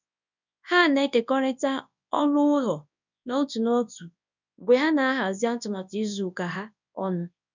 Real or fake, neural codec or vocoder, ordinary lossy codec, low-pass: fake; codec, 24 kHz, 0.9 kbps, WavTokenizer, large speech release; none; 7.2 kHz